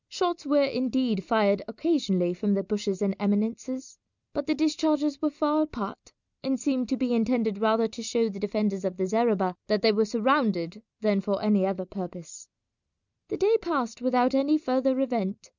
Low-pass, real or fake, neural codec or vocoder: 7.2 kHz; real; none